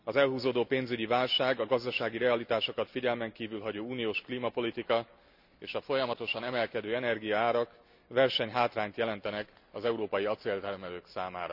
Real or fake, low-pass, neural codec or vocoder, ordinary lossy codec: real; 5.4 kHz; none; none